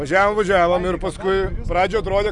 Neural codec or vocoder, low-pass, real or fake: none; 10.8 kHz; real